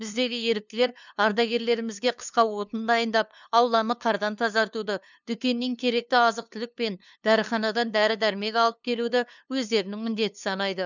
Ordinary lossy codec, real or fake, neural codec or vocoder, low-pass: none; fake; codec, 16 kHz, 2 kbps, FunCodec, trained on LibriTTS, 25 frames a second; 7.2 kHz